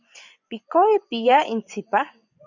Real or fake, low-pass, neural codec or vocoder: fake; 7.2 kHz; vocoder, 24 kHz, 100 mel bands, Vocos